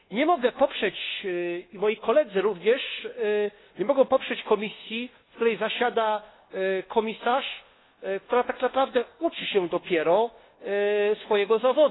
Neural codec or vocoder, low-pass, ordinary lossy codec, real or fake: codec, 24 kHz, 1.2 kbps, DualCodec; 7.2 kHz; AAC, 16 kbps; fake